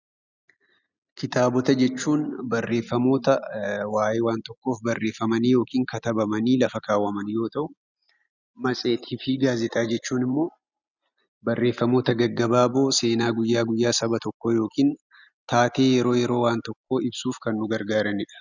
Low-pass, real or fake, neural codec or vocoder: 7.2 kHz; real; none